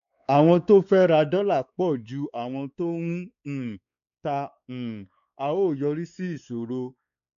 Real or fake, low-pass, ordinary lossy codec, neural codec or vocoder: fake; 7.2 kHz; none; codec, 16 kHz, 4 kbps, X-Codec, WavLM features, trained on Multilingual LibriSpeech